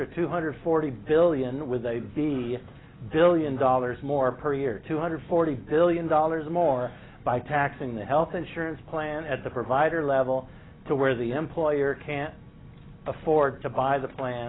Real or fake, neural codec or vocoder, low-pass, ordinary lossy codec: real; none; 7.2 kHz; AAC, 16 kbps